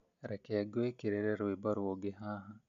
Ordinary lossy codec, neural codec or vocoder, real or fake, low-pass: MP3, 64 kbps; none; real; 7.2 kHz